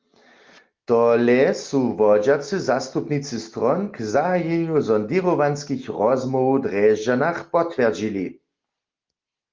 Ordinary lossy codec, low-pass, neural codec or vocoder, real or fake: Opus, 32 kbps; 7.2 kHz; none; real